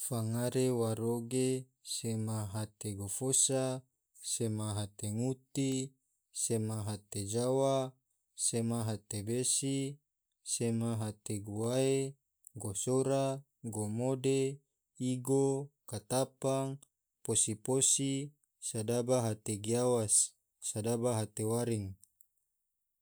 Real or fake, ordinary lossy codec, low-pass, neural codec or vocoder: real; none; none; none